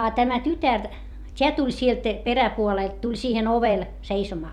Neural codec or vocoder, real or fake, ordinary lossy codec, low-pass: vocoder, 44.1 kHz, 128 mel bands every 256 samples, BigVGAN v2; fake; none; 19.8 kHz